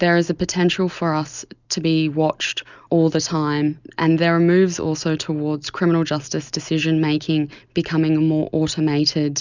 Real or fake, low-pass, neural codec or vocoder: real; 7.2 kHz; none